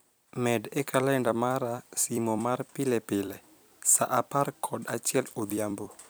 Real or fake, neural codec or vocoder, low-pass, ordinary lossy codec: fake; vocoder, 44.1 kHz, 128 mel bands every 256 samples, BigVGAN v2; none; none